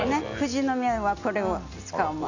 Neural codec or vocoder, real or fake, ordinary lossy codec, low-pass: none; real; none; 7.2 kHz